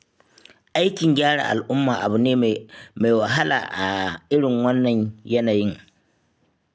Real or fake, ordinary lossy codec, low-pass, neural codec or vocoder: real; none; none; none